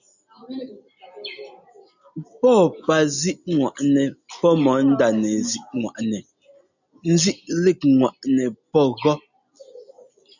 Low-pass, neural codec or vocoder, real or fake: 7.2 kHz; none; real